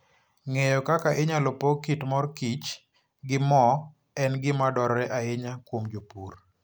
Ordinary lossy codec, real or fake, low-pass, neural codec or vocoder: none; real; none; none